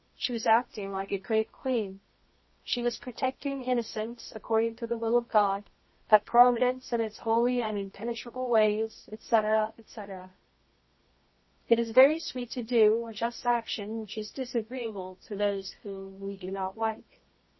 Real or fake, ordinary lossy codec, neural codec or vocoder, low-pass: fake; MP3, 24 kbps; codec, 24 kHz, 0.9 kbps, WavTokenizer, medium music audio release; 7.2 kHz